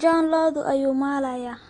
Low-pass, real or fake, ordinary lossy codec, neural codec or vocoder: 9.9 kHz; real; AAC, 32 kbps; none